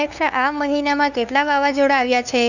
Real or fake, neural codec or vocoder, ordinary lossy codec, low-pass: fake; codec, 16 kHz, 2 kbps, FunCodec, trained on LibriTTS, 25 frames a second; none; 7.2 kHz